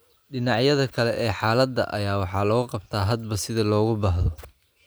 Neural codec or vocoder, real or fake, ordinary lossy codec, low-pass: none; real; none; none